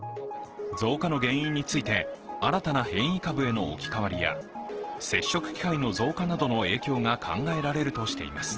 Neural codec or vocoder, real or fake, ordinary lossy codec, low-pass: none; real; Opus, 16 kbps; 7.2 kHz